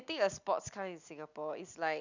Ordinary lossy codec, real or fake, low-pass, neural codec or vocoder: none; real; 7.2 kHz; none